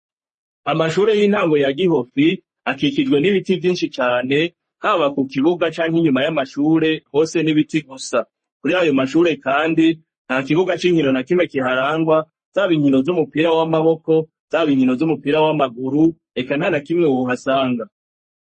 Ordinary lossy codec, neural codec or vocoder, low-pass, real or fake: MP3, 32 kbps; codec, 44.1 kHz, 3.4 kbps, Pupu-Codec; 10.8 kHz; fake